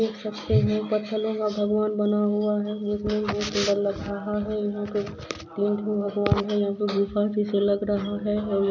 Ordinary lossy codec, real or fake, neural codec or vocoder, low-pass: none; real; none; 7.2 kHz